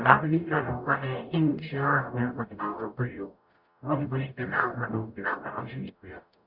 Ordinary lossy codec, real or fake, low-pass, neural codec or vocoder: Opus, 64 kbps; fake; 5.4 kHz; codec, 44.1 kHz, 0.9 kbps, DAC